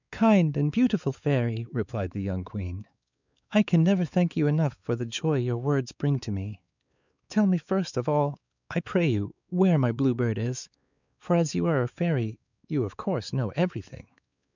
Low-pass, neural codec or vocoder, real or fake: 7.2 kHz; codec, 16 kHz, 4 kbps, X-Codec, WavLM features, trained on Multilingual LibriSpeech; fake